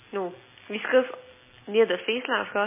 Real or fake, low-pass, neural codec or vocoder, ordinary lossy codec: real; 3.6 kHz; none; MP3, 16 kbps